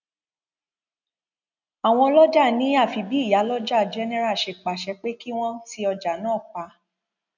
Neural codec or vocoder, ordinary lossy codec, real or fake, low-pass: none; none; real; 7.2 kHz